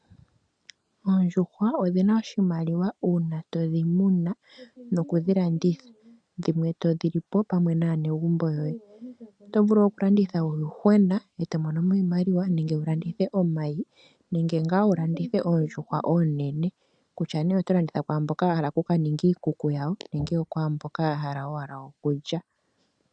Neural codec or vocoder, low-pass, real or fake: none; 9.9 kHz; real